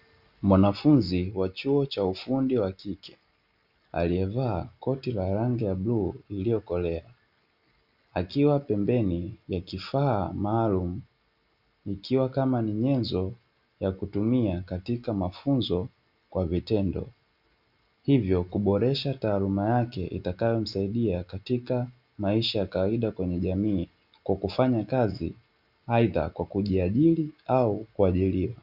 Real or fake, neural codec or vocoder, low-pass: real; none; 5.4 kHz